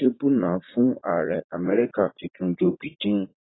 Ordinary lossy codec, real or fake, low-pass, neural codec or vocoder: AAC, 16 kbps; fake; 7.2 kHz; vocoder, 44.1 kHz, 80 mel bands, Vocos